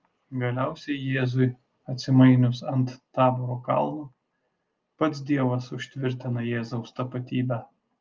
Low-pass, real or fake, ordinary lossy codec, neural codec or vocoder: 7.2 kHz; real; Opus, 24 kbps; none